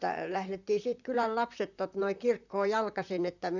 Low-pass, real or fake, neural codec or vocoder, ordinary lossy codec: 7.2 kHz; fake; vocoder, 44.1 kHz, 128 mel bands, Pupu-Vocoder; none